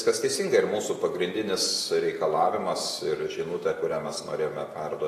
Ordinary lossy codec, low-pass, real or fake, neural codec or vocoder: AAC, 48 kbps; 14.4 kHz; real; none